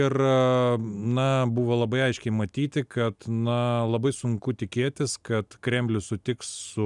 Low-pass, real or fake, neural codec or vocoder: 10.8 kHz; real; none